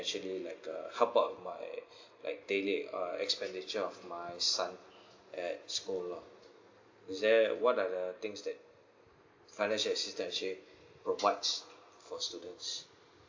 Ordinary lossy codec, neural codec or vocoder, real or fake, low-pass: AAC, 48 kbps; none; real; 7.2 kHz